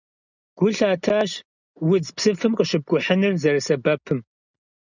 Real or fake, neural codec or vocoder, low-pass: real; none; 7.2 kHz